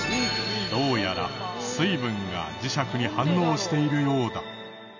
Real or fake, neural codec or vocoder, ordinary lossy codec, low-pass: real; none; none; 7.2 kHz